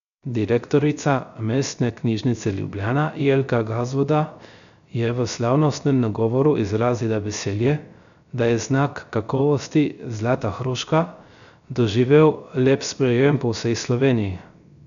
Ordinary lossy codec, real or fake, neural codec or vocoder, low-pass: none; fake; codec, 16 kHz, 0.3 kbps, FocalCodec; 7.2 kHz